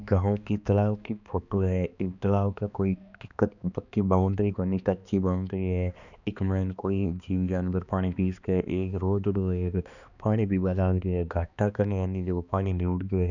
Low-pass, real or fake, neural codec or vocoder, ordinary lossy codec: 7.2 kHz; fake; codec, 16 kHz, 2 kbps, X-Codec, HuBERT features, trained on balanced general audio; none